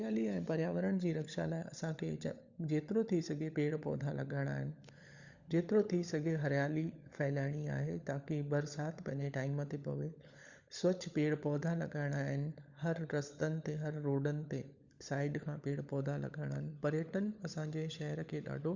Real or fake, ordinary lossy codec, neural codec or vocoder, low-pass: fake; Opus, 64 kbps; codec, 16 kHz, 16 kbps, FunCodec, trained on LibriTTS, 50 frames a second; 7.2 kHz